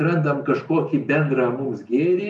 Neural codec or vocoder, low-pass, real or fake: none; 10.8 kHz; real